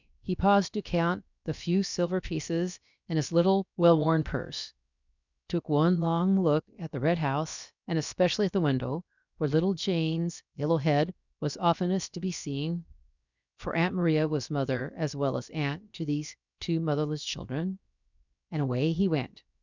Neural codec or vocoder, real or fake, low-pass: codec, 16 kHz, about 1 kbps, DyCAST, with the encoder's durations; fake; 7.2 kHz